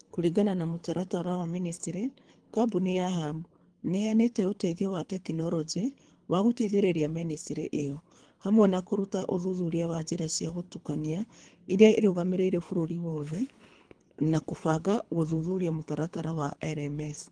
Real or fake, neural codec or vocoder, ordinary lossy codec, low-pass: fake; codec, 24 kHz, 3 kbps, HILCodec; Opus, 24 kbps; 9.9 kHz